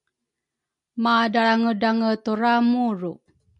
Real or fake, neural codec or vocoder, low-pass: real; none; 10.8 kHz